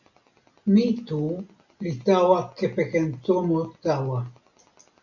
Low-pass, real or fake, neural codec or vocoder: 7.2 kHz; real; none